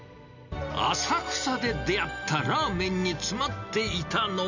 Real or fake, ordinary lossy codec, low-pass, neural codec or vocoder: real; none; 7.2 kHz; none